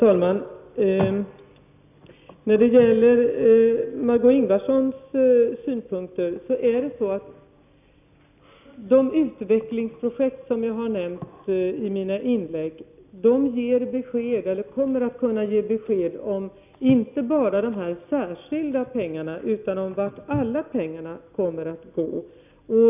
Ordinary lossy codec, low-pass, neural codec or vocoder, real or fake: none; 3.6 kHz; none; real